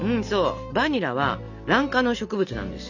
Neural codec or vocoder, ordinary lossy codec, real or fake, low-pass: none; none; real; 7.2 kHz